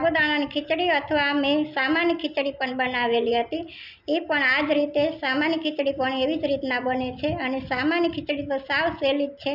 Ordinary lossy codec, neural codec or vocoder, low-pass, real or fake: none; none; 5.4 kHz; real